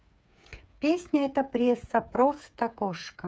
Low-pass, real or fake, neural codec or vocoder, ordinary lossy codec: none; fake; codec, 16 kHz, 8 kbps, FreqCodec, smaller model; none